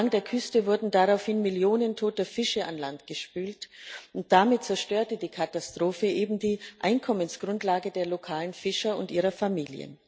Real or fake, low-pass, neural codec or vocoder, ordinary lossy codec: real; none; none; none